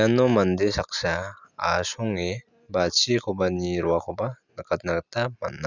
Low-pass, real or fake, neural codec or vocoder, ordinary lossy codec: 7.2 kHz; real; none; none